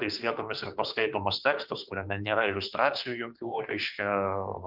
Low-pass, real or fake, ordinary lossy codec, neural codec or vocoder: 5.4 kHz; fake; Opus, 24 kbps; autoencoder, 48 kHz, 32 numbers a frame, DAC-VAE, trained on Japanese speech